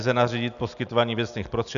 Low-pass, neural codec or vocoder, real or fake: 7.2 kHz; none; real